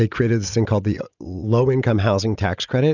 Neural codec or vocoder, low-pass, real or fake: none; 7.2 kHz; real